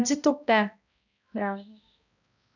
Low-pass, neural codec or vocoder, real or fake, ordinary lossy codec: 7.2 kHz; codec, 16 kHz, 0.5 kbps, X-Codec, HuBERT features, trained on balanced general audio; fake; none